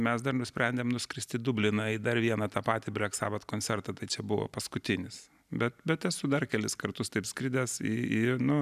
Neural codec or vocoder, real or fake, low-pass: none; real; 14.4 kHz